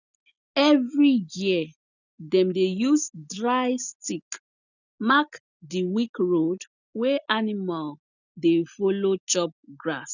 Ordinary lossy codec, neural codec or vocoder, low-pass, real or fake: none; none; 7.2 kHz; real